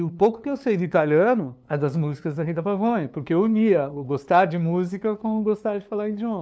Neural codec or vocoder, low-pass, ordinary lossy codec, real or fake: codec, 16 kHz, 2 kbps, FunCodec, trained on LibriTTS, 25 frames a second; none; none; fake